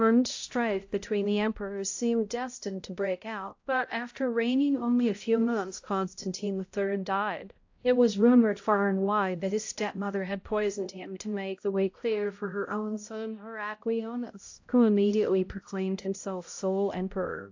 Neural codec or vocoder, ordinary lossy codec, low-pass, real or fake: codec, 16 kHz, 0.5 kbps, X-Codec, HuBERT features, trained on balanced general audio; AAC, 48 kbps; 7.2 kHz; fake